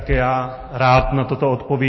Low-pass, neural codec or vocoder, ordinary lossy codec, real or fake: 7.2 kHz; none; MP3, 24 kbps; real